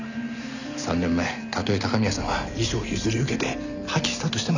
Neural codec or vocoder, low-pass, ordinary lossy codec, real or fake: none; 7.2 kHz; none; real